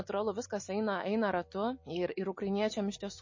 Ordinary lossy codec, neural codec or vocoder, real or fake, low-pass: MP3, 32 kbps; none; real; 7.2 kHz